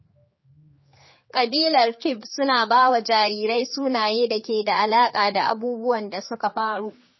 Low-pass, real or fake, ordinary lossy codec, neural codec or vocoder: 7.2 kHz; fake; MP3, 24 kbps; codec, 16 kHz, 4 kbps, X-Codec, HuBERT features, trained on general audio